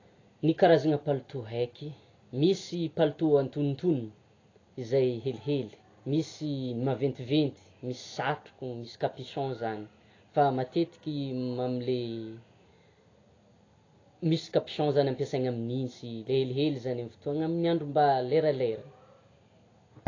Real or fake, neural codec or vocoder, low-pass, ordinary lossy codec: real; none; 7.2 kHz; AAC, 32 kbps